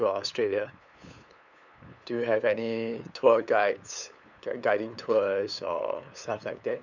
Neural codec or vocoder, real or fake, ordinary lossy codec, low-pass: codec, 16 kHz, 8 kbps, FunCodec, trained on LibriTTS, 25 frames a second; fake; none; 7.2 kHz